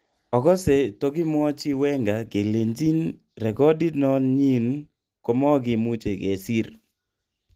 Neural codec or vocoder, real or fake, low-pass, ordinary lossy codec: none; real; 14.4 kHz; Opus, 24 kbps